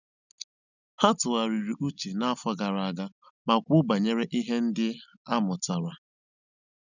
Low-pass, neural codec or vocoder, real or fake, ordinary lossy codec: 7.2 kHz; none; real; none